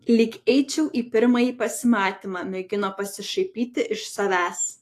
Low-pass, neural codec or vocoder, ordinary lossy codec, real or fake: 14.4 kHz; autoencoder, 48 kHz, 128 numbers a frame, DAC-VAE, trained on Japanese speech; AAC, 48 kbps; fake